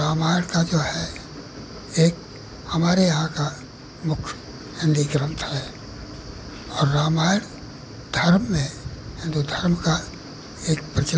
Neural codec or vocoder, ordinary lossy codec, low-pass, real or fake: none; none; none; real